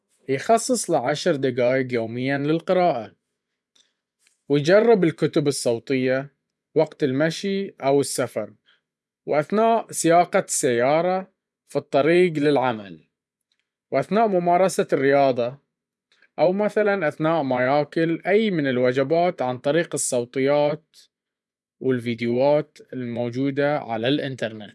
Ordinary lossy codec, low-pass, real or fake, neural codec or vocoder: none; none; fake; vocoder, 24 kHz, 100 mel bands, Vocos